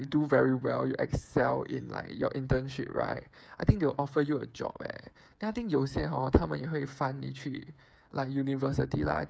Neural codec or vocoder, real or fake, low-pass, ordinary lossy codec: codec, 16 kHz, 8 kbps, FreqCodec, smaller model; fake; none; none